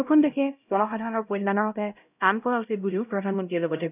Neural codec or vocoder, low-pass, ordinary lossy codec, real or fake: codec, 16 kHz, 0.5 kbps, X-Codec, HuBERT features, trained on LibriSpeech; 3.6 kHz; none; fake